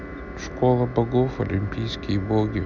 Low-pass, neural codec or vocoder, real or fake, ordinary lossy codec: 7.2 kHz; none; real; none